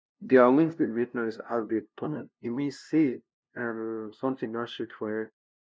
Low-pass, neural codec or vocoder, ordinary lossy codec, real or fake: none; codec, 16 kHz, 0.5 kbps, FunCodec, trained on LibriTTS, 25 frames a second; none; fake